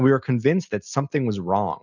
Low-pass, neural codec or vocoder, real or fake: 7.2 kHz; none; real